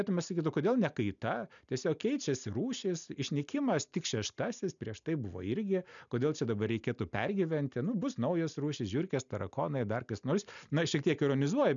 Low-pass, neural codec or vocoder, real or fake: 7.2 kHz; none; real